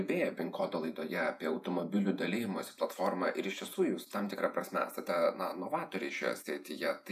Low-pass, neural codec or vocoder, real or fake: 14.4 kHz; vocoder, 48 kHz, 128 mel bands, Vocos; fake